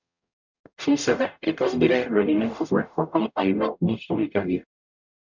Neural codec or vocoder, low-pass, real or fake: codec, 44.1 kHz, 0.9 kbps, DAC; 7.2 kHz; fake